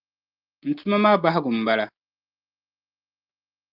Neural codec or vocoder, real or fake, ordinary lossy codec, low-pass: none; real; Opus, 24 kbps; 5.4 kHz